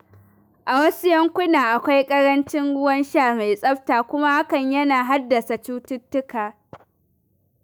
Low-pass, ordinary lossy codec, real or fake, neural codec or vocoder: none; none; fake; autoencoder, 48 kHz, 128 numbers a frame, DAC-VAE, trained on Japanese speech